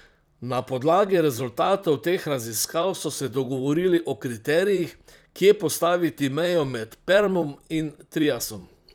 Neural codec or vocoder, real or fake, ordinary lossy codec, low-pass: vocoder, 44.1 kHz, 128 mel bands, Pupu-Vocoder; fake; none; none